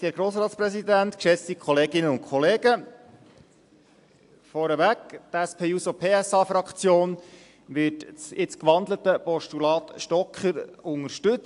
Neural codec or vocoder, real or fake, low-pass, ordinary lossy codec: none; real; 10.8 kHz; AAC, 64 kbps